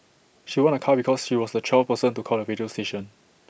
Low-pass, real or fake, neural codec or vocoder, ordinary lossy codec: none; real; none; none